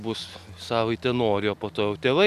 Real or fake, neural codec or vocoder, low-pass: real; none; 14.4 kHz